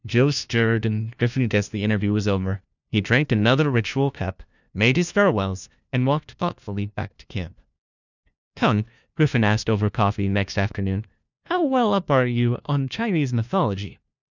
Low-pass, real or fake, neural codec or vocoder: 7.2 kHz; fake; codec, 16 kHz, 1 kbps, FunCodec, trained on LibriTTS, 50 frames a second